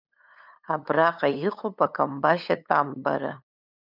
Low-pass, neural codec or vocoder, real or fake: 5.4 kHz; codec, 16 kHz, 8 kbps, FunCodec, trained on LibriTTS, 25 frames a second; fake